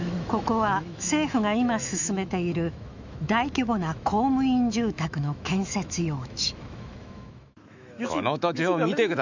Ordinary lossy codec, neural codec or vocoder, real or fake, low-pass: none; autoencoder, 48 kHz, 128 numbers a frame, DAC-VAE, trained on Japanese speech; fake; 7.2 kHz